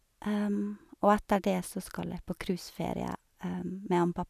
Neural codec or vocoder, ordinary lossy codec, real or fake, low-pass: none; none; real; 14.4 kHz